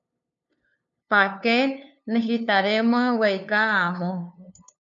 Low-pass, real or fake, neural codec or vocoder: 7.2 kHz; fake; codec, 16 kHz, 2 kbps, FunCodec, trained on LibriTTS, 25 frames a second